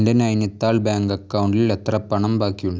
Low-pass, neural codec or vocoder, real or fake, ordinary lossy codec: none; none; real; none